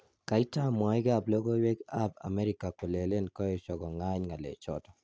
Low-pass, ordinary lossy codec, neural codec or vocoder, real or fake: none; none; none; real